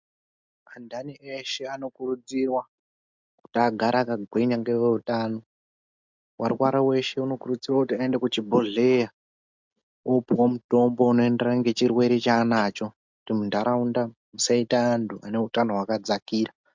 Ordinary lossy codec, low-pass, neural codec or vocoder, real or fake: MP3, 64 kbps; 7.2 kHz; none; real